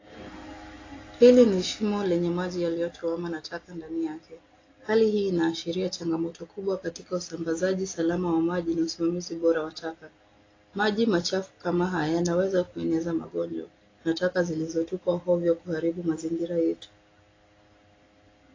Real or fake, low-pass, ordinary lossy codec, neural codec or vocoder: real; 7.2 kHz; AAC, 32 kbps; none